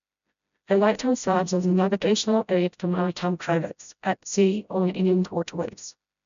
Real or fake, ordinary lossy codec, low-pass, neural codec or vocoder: fake; none; 7.2 kHz; codec, 16 kHz, 0.5 kbps, FreqCodec, smaller model